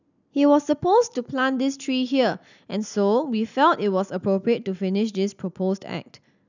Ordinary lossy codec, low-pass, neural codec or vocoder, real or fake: none; 7.2 kHz; none; real